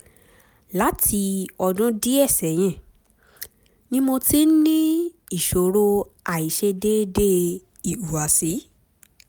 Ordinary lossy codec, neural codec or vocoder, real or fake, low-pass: none; none; real; none